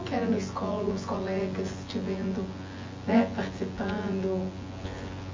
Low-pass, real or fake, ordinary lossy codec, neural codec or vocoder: 7.2 kHz; fake; MP3, 32 kbps; vocoder, 24 kHz, 100 mel bands, Vocos